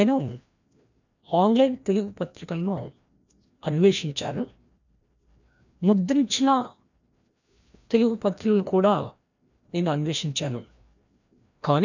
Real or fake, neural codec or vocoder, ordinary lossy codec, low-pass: fake; codec, 16 kHz, 1 kbps, FreqCodec, larger model; none; 7.2 kHz